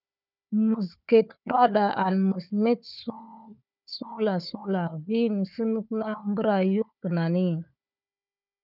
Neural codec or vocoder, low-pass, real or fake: codec, 16 kHz, 4 kbps, FunCodec, trained on Chinese and English, 50 frames a second; 5.4 kHz; fake